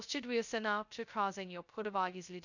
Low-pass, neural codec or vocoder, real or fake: 7.2 kHz; codec, 16 kHz, 0.2 kbps, FocalCodec; fake